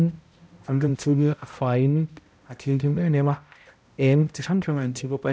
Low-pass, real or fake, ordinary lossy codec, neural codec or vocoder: none; fake; none; codec, 16 kHz, 0.5 kbps, X-Codec, HuBERT features, trained on balanced general audio